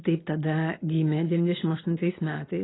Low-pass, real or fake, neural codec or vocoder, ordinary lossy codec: 7.2 kHz; real; none; AAC, 16 kbps